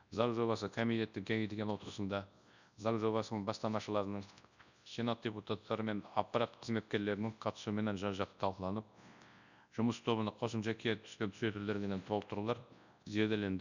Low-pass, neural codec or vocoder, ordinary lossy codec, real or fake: 7.2 kHz; codec, 24 kHz, 0.9 kbps, WavTokenizer, large speech release; none; fake